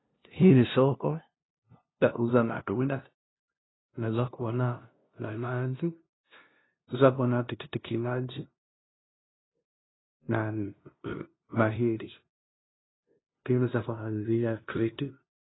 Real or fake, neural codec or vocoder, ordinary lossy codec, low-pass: fake; codec, 16 kHz, 0.5 kbps, FunCodec, trained on LibriTTS, 25 frames a second; AAC, 16 kbps; 7.2 kHz